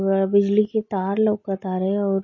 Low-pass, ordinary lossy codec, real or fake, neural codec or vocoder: 7.2 kHz; MP3, 32 kbps; real; none